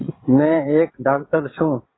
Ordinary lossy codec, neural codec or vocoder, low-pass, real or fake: AAC, 16 kbps; vocoder, 22.05 kHz, 80 mel bands, WaveNeXt; 7.2 kHz; fake